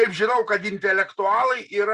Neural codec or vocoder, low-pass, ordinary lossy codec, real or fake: none; 10.8 kHz; Opus, 64 kbps; real